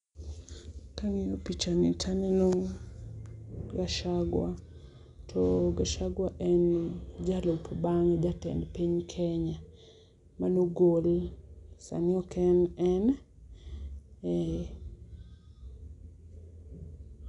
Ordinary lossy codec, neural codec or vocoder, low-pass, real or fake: none; none; 10.8 kHz; real